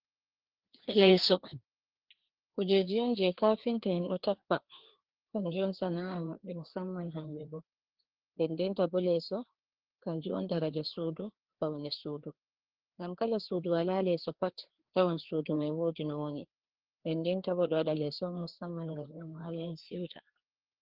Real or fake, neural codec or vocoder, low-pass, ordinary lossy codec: fake; codec, 16 kHz, 2 kbps, FreqCodec, larger model; 5.4 kHz; Opus, 16 kbps